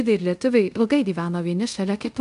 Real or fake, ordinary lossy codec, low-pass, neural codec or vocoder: fake; MP3, 64 kbps; 10.8 kHz; codec, 24 kHz, 0.5 kbps, DualCodec